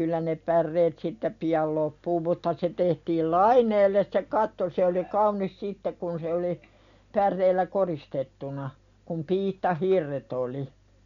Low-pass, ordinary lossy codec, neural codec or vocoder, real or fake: 7.2 kHz; none; none; real